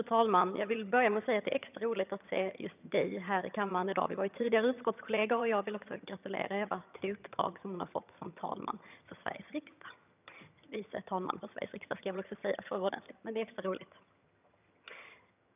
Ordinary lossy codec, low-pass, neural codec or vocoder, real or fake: none; 3.6 kHz; vocoder, 22.05 kHz, 80 mel bands, HiFi-GAN; fake